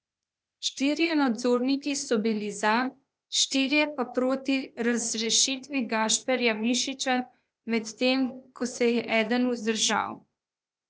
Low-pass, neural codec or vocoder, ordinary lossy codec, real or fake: none; codec, 16 kHz, 0.8 kbps, ZipCodec; none; fake